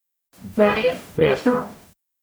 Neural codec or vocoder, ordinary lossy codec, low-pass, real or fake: codec, 44.1 kHz, 0.9 kbps, DAC; none; none; fake